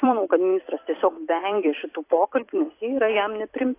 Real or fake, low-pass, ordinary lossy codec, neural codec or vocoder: fake; 3.6 kHz; AAC, 24 kbps; vocoder, 44.1 kHz, 128 mel bands every 256 samples, BigVGAN v2